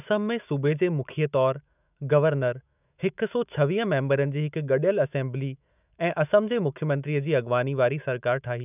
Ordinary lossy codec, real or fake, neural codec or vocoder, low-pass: none; fake; autoencoder, 48 kHz, 128 numbers a frame, DAC-VAE, trained on Japanese speech; 3.6 kHz